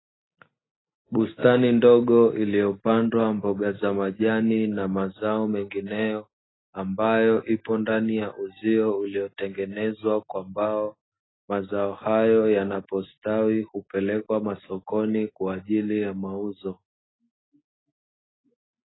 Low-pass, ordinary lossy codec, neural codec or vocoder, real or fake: 7.2 kHz; AAC, 16 kbps; none; real